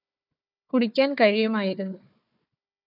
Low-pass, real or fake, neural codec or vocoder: 5.4 kHz; fake; codec, 16 kHz, 4 kbps, FunCodec, trained on Chinese and English, 50 frames a second